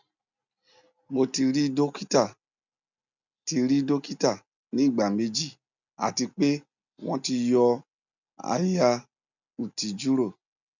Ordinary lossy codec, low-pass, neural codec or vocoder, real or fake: none; 7.2 kHz; none; real